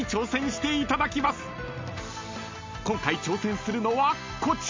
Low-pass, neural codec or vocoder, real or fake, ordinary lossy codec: 7.2 kHz; none; real; MP3, 64 kbps